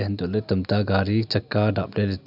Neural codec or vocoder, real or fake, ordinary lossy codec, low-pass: none; real; none; 5.4 kHz